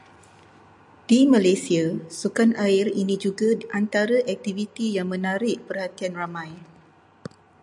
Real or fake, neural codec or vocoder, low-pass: real; none; 10.8 kHz